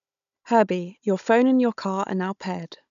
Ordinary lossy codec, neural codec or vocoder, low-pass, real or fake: none; codec, 16 kHz, 16 kbps, FunCodec, trained on Chinese and English, 50 frames a second; 7.2 kHz; fake